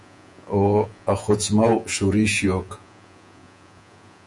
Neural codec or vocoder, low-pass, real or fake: vocoder, 48 kHz, 128 mel bands, Vocos; 10.8 kHz; fake